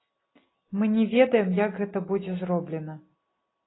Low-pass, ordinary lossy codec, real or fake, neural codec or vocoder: 7.2 kHz; AAC, 16 kbps; real; none